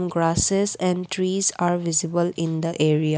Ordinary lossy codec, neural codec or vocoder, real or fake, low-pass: none; none; real; none